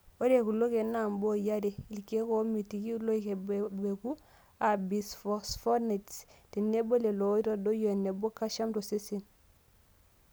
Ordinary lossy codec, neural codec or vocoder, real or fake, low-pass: none; none; real; none